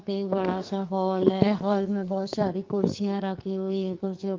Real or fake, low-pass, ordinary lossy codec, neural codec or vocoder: fake; 7.2 kHz; Opus, 24 kbps; codec, 44.1 kHz, 2.6 kbps, SNAC